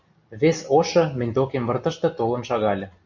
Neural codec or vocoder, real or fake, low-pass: none; real; 7.2 kHz